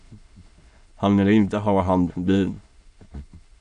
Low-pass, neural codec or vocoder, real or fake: 9.9 kHz; autoencoder, 22.05 kHz, a latent of 192 numbers a frame, VITS, trained on many speakers; fake